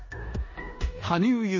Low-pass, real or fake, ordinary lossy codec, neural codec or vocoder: 7.2 kHz; fake; MP3, 32 kbps; codec, 16 kHz in and 24 kHz out, 0.9 kbps, LongCat-Audio-Codec, four codebook decoder